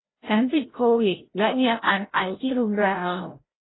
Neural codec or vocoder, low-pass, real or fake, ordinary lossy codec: codec, 16 kHz, 0.5 kbps, FreqCodec, larger model; 7.2 kHz; fake; AAC, 16 kbps